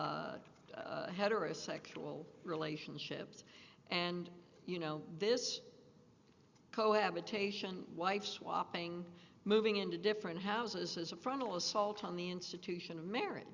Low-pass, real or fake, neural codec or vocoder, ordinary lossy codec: 7.2 kHz; real; none; Opus, 64 kbps